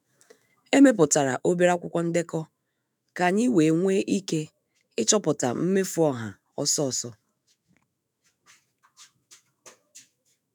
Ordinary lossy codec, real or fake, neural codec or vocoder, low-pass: none; fake; autoencoder, 48 kHz, 128 numbers a frame, DAC-VAE, trained on Japanese speech; none